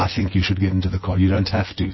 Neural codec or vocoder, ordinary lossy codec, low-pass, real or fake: vocoder, 24 kHz, 100 mel bands, Vocos; MP3, 24 kbps; 7.2 kHz; fake